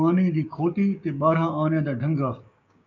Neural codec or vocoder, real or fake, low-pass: codec, 16 kHz, 6 kbps, DAC; fake; 7.2 kHz